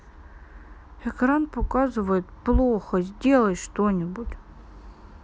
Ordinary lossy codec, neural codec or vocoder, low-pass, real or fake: none; none; none; real